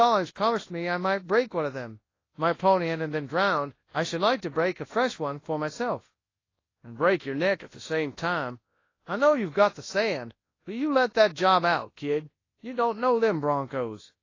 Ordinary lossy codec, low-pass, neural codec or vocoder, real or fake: AAC, 32 kbps; 7.2 kHz; codec, 24 kHz, 0.9 kbps, WavTokenizer, large speech release; fake